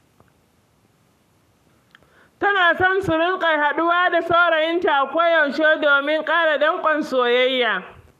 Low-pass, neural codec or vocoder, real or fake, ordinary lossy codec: 14.4 kHz; codec, 44.1 kHz, 7.8 kbps, Pupu-Codec; fake; none